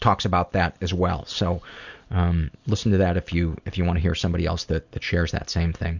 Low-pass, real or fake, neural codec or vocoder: 7.2 kHz; real; none